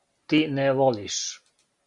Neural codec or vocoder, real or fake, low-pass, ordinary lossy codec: none; real; 10.8 kHz; Opus, 64 kbps